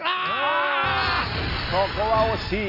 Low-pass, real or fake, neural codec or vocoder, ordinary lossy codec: 5.4 kHz; real; none; none